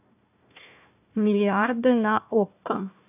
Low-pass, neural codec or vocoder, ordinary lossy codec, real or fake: 3.6 kHz; codec, 16 kHz, 1 kbps, FunCodec, trained on Chinese and English, 50 frames a second; none; fake